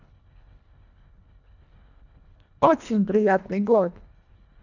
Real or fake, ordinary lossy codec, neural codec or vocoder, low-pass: fake; none; codec, 24 kHz, 1.5 kbps, HILCodec; 7.2 kHz